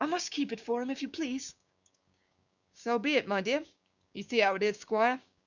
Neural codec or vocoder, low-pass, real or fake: codec, 16 kHz, 6 kbps, DAC; 7.2 kHz; fake